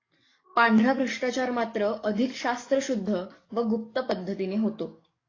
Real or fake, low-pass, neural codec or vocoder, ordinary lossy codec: fake; 7.2 kHz; codec, 44.1 kHz, 7.8 kbps, DAC; AAC, 32 kbps